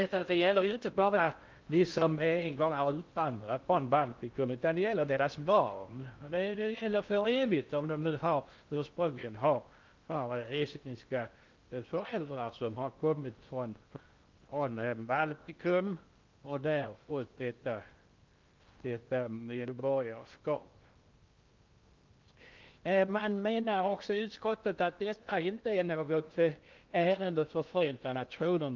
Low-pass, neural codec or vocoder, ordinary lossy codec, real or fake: 7.2 kHz; codec, 16 kHz in and 24 kHz out, 0.6 kbps, FocalCodec, streaming, 4096 codes; Opus, 24 kbps; fake